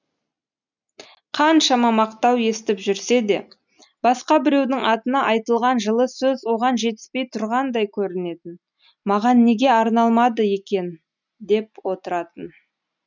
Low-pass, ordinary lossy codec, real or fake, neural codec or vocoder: 7.2 kHz; none; real; none